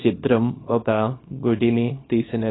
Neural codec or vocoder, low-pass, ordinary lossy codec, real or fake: codec, 24 kHz, 0.9 kbps, WavTokenizer, small release; 7.2 kHz; AAC, 16 kbps; fake